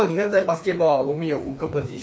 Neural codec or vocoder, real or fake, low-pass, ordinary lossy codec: codec, 16 kHz, 2 kbps, FreqCodec, larger model; fake; none; none